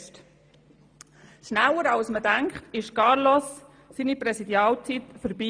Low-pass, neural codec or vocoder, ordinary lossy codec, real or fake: 9.9 kHz; none; Opus, 32 kbps; real